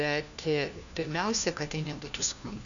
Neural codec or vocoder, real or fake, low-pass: codec, 16 kHz, 1 kbps, FunCodec, trained on LibriTTS, 50 frames a second; fake; 7.2 kHz